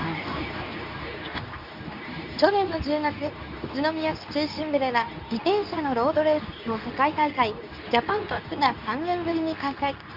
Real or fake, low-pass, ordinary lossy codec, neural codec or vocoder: fake; 5.4 kHz; Opus, 64 kbps; codec, 24 kHz, 0.9 kbps, WavTokenizer, medium speech release version 2